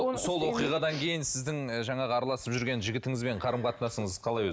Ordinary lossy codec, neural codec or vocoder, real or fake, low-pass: none; none; real; none